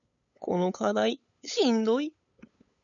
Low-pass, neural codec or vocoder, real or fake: 7.2 kHz; codec, 16 kHz, 8 kbps, FunCodec, trained on LibriTTS, 25 frames a second; fake